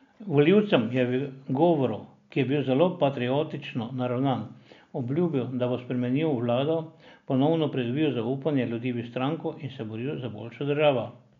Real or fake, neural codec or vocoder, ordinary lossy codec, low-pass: real; none; MP3, 64 kbps; 7.2 kHz